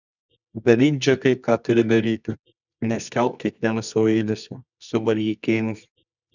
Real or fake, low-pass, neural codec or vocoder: fake; 7.2 kHz; codec, 24 kHz, 0.9 kbps, WavTokenizer, medium music audio release